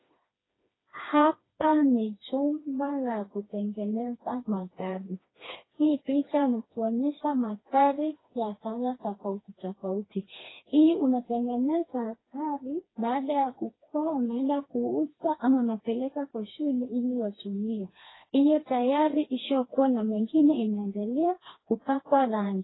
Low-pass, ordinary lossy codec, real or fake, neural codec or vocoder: 7.2 kHz; AAC, 16 kbps; fake; codec, 16 kHz, 2 kbps, FreqCodec, smaller model